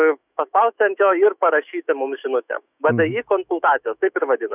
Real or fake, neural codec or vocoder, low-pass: fake; autoencoder, 48 kHz, 128 numbers a frame, DAC-VAE, trained on Japanese speech; 3.6 kHz